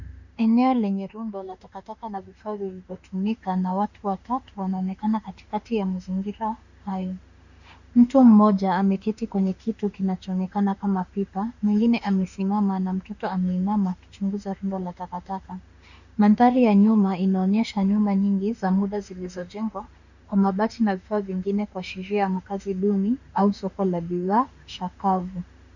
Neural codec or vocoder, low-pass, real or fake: autoencoder, 48 kHz, 32 numbers a frame, DAC-VAE, trained on Japanese speech; 7.2 kHz; fake